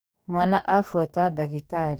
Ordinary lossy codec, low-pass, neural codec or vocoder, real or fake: none; none; codec, 44.1 kHz, 2.6 kbps, DAC; fake